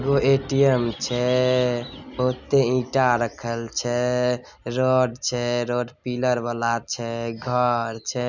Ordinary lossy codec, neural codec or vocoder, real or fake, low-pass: none; none; real; 7.2 kHz